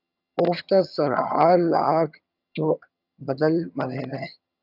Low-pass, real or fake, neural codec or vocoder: 5.4 kHz; fake; vocoder, 22.05 kHz, 80 mel bands, HiFi-GAN